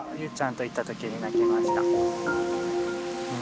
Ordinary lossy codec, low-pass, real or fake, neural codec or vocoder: none; none; real; none